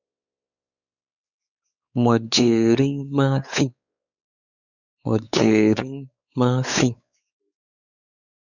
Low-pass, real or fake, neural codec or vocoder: 7.2 kHz; fake; codec, 16 kHz, 4 kbps, X-Codec, WavLM features, trained on Multilingual LibriSpeech